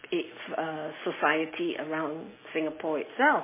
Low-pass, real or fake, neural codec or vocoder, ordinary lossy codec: 3.6 kHz; real; none; MP3, 16 kbps